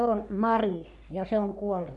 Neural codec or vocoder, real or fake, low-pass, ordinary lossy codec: codec, 44.1 kHz, 3.4 kbps, Pupu-Codec; fake; 10.8 kHz; none